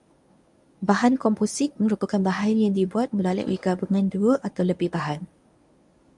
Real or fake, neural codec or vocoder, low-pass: fake; codec, 24 kHz, 0.9 kbps, WavTokenizer, medium speech release version 1; 10.8 kHz